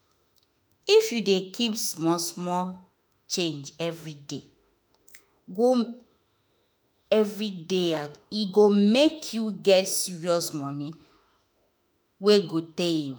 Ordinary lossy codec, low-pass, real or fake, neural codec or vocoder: none; none; fake; autoencoder, 48 kHz, 32 numbers a frame, DAC-VAE, trained on Japanese speech